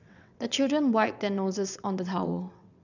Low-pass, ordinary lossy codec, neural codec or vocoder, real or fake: 7.2 kHz; none; vocoder, 22.05 kHz, 80 mel bands, WaveNeXt; fake